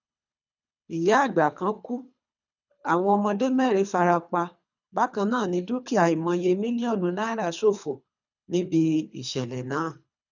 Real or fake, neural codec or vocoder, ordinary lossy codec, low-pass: fake; codec, 24 kHz, 3 kbps, HILCodec; none; 7.2 kHz